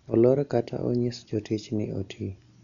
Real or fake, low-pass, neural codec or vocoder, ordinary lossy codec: real; 7.2 kHz; none; none